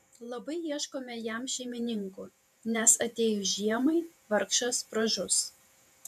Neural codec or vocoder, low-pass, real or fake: vocoder, 48 kHz, 128 mel bands, Vocos; 14.4 kHz; fake